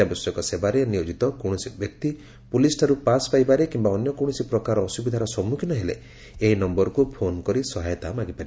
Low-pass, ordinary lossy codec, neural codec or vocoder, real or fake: none; none; none; real